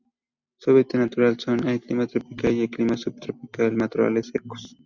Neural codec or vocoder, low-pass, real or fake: none; 7.2 kHz; real